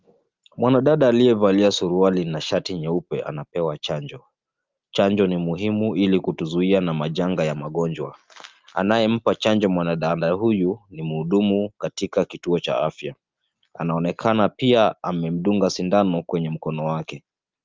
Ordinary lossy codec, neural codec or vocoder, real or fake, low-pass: Opus, 32 kbps; none; real; 7.2 kHz